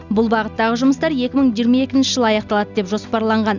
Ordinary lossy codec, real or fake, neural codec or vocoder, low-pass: none; real; none; 7.2 kHz